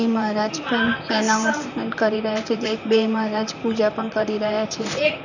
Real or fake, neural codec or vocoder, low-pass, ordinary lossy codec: fake; vocoder, 44.1 kHz, 128 mel bands, Pupu-Vocoder; 7.2 kHz; none